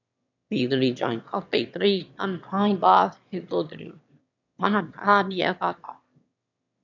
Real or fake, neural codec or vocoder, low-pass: fake; autoencoder, 22.05 kHz, a latent of 192 numbers a frame, VITS, trained on one speaker; 7.2 kHz